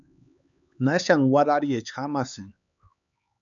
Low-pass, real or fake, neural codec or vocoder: 7.2 kHz; fake; codec, 16 kHz, 4 kbps, X-Codec, HuBERT features, trained on LibriSpeech